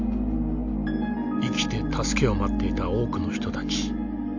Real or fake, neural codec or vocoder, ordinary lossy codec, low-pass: real; none; AAC, 48 kbps; 7.2 kHz